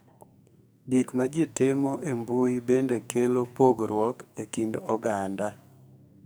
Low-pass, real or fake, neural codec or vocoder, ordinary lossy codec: none; fake; codec, 44.1 kHz, 2.6 kbps, SNAC; none